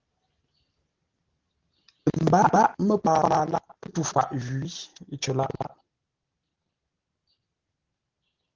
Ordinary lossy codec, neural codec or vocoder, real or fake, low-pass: Opus, 16 kbps; none; real; 7.2 kHz